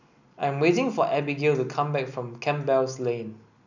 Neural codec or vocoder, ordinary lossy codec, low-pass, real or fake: none; none; 7.2 kHz; real